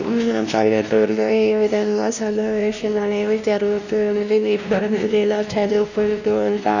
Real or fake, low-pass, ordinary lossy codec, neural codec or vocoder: fake; 7.2 kHz; none; codec, 16 kHz, 1 kbps, X-Codec, WavLM features, trained on Multilingual LibriSpeech